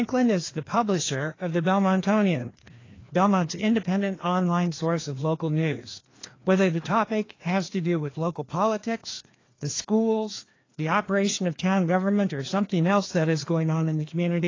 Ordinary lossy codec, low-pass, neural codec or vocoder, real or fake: AAC, 32 kbps; 7.2 kHz; codec, 16 kHz, 2 kbps, FreqCodec, larger model; fake